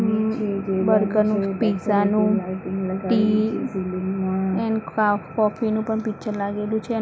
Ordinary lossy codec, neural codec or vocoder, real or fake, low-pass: none; none; real; none